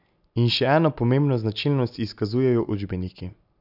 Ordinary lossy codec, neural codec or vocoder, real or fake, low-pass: none; none; real; 5.4 kHz